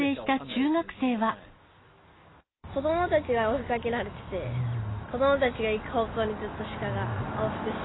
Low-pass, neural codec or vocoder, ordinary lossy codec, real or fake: 7.2 kHz; none; AAC, 16 kbps; real